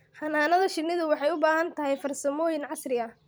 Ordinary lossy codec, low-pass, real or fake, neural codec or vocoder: none; none; fake; vocoder, 44.1 kHz, 128 mel bands every 256 samples, BigVGAN v2